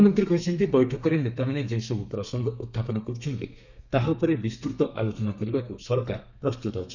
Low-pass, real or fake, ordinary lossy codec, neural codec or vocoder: 7.2 kHz; fake; none; codec, 32 kHz, 1.9 kbps, SNAC